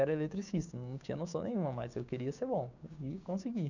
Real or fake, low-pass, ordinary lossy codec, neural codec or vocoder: fake; 7.2 kHz; none; codec, 16 kHz, 6 kbps, DAC